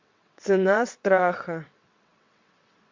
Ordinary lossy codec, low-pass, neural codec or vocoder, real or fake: MP3, 48 kbps; 7.2 kHz; vocoder, 22.05 kHz, 80 mel bands, WaveNeXt; fake